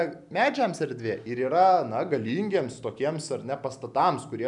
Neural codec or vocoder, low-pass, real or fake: none; 10.8 kHz; real